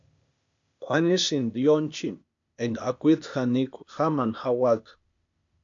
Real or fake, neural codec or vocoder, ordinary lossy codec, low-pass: fake; codec, 16 kHz, 0.8 kbps, ZipCodec; MP3, 64 kbps; 7.2 kHz